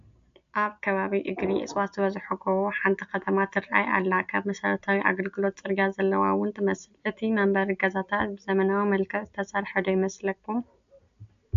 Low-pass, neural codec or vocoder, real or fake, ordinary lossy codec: 7.2 kHz; none; real; MP3, 64 kbps